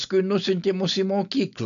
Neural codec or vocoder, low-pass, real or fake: none; 7.2 kHz; real